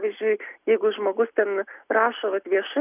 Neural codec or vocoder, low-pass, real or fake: none; 3.6 kHz; real